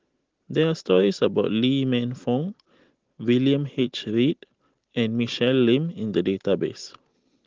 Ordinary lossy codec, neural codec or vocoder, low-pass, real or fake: Opus, 16 kbps; none; 7.2 kHz; real